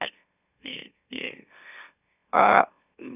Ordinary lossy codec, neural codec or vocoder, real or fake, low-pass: none; autoencoder, 44.1 kHz, a latent of 192 numbers a frame, MeloTTS; fake; 3.6 kHz